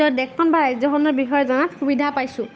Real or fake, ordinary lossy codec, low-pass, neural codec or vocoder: fake; none; none; codec, 16 kHz, 8 kbps, FunCodec, trained on Chinese and English, 25 frames a second